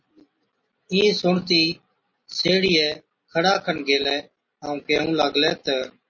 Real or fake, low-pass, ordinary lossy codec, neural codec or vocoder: real; 7.2 kHz; MP3, 32 kbps; none